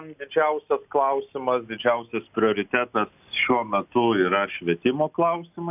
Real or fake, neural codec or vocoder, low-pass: real; none; 3.6 kHz